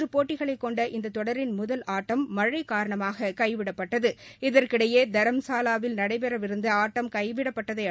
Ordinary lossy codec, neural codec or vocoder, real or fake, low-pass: none; none; real; none